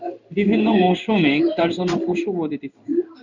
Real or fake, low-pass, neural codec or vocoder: fake; 7.2 kHz; codec, 16 kHz in and 24 kHz out, 1 kbps, XY-Tokenizer